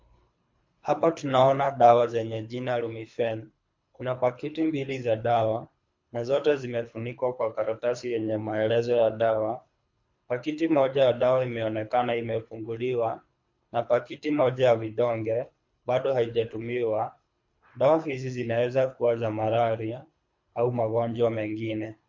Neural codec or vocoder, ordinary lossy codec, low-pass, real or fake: codec, 24 kHz, 3 kbps, HILCodec; MP3, 48 kbps; 7.2 kHz; fake